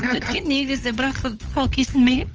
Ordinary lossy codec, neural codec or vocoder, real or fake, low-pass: Opus, 24 kbps; codec, 16 kHz, 8 kbps, FunCodec, trained on LibriTTS, 25 frames a second; fake; 7.2 kHz